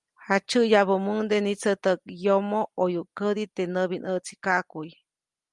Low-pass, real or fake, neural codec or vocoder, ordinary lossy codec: 10.8 kHz; real; none; Opus, 32 kbps